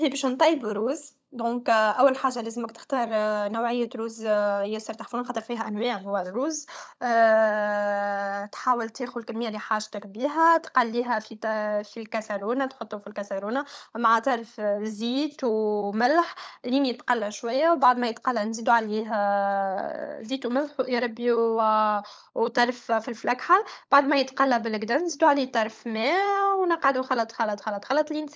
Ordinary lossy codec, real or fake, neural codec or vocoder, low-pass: none; fake; codec, 16 kHz, 16 kbps, FunCodec, trained on LibriTTS, 50 frames a second; none